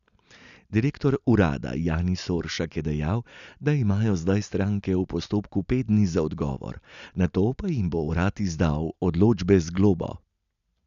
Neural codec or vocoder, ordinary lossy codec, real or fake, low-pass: none; none; real; 7.2 kHz